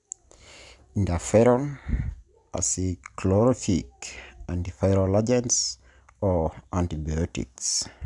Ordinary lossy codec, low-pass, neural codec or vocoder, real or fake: none; 10.8 kHz; none; real